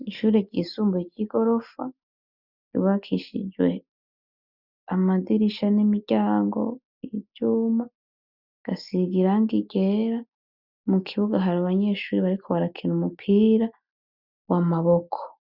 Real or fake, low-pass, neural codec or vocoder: real; 5.4 kHz; none